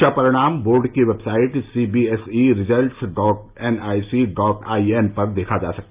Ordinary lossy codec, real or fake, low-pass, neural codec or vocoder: Opus, 32 kbps; real; 3.6 kHz; none